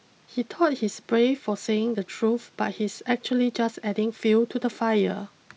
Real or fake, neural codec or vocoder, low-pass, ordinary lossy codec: real; none; none; none